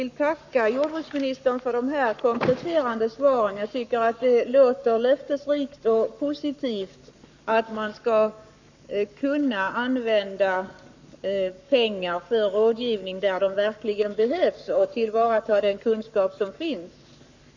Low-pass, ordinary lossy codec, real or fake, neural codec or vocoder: 7.2 kHz; none; fake; codec, 44.1 kHz, 7.8 kbps, Pupu-Codec